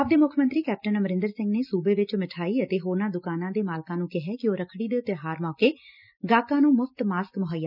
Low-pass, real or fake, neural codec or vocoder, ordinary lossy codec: 5.4 kHz; real; none; none